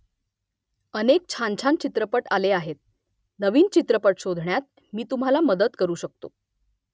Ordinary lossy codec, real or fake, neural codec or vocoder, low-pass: none; real; none; none